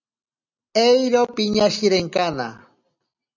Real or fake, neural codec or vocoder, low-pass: real; none; 7.2 kHz